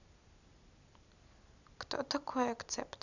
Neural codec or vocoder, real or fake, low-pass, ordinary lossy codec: none; real; 7.2 kHz; none